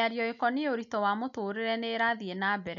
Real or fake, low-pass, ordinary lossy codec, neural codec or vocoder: real; 7.2 kHz; none; none